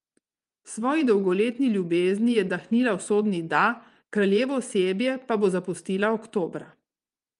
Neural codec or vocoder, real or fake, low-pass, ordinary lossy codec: vocoder, 24 kHz, 100 mel bands, Vocos; fake; 10.8 kHz; Opus, 32 kbps